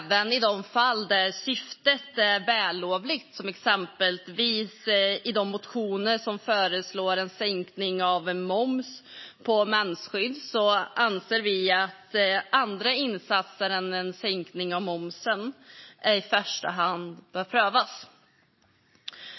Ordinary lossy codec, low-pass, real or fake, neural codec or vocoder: MP3, 24 kbps; 7.2 kHz; real; none